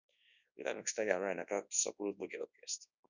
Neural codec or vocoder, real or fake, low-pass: codec, 24 kHz, 0.9 kbps, WavTokenizer, large speech release; fake; 7.2 kHz